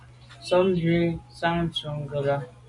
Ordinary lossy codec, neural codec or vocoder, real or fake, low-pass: AAC, 64 kbps; none; real; 10.8 kHz